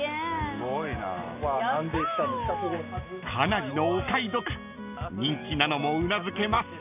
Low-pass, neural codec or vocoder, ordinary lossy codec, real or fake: 3.6 kHz; none; none; real